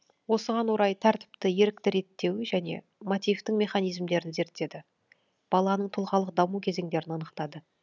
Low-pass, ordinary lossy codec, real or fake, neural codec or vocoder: 7.2 kHz; none; real; none